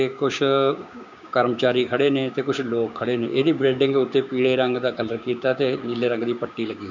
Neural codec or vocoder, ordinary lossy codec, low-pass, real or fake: codec, 16 kHz, 6 kbps, DAC; none; 7.2 kHz; fake